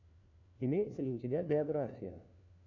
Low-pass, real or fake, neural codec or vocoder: 7.2 kHz; fake; codec, 16 kHz, 1 kbps, FunCodec, trained on LibriTTS, 50 frames a second